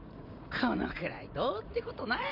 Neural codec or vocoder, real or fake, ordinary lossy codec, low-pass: vocoder, 44.1 kHz, 80 mel bands, Vocos; fake; none; 5.4 kHz